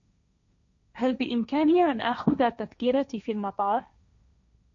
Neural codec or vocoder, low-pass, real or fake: codec, 16 kHz, 1.1 kbps, Voila-Tokenizer; 7.2 kHz; fake